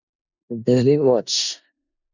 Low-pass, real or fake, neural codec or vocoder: 7.2 kHz; fake; codec, 16 kHz in and 24 kHz out, 0.4 kbps, LongCat-Audio-Codec, four codebook decoder